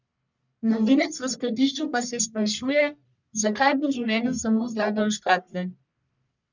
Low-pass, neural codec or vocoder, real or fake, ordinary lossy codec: 7.2 kHz; codec, 44.1 kHz, 1.7 kbps, Pupu-Codec; fake; none